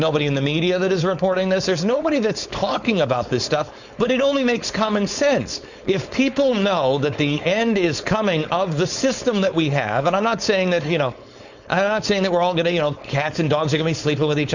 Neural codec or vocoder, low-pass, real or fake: codec, 16 kHz, 4.8 kbps, FACodec; 7.2 kHz; fake